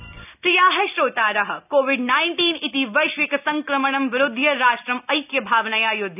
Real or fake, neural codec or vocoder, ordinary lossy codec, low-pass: real; none; none; 3.6 kHz